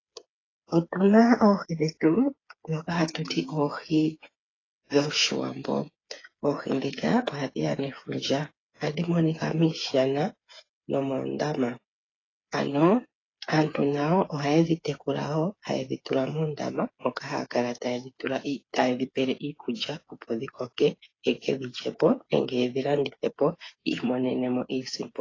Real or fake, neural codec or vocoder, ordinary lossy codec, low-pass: fake; codec, 16 kHz, 8 kbps, FreqCodec, smaller model; AAC, 32 kbps; 7.2 kHz